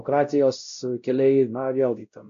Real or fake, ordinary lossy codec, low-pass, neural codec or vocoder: fake; AAC, 64 kbps; 7.2 kHz; codec, 16 kHz, 0.5 kbps, X-Codec, WavLM features, trained on Multilingual LibriSpeech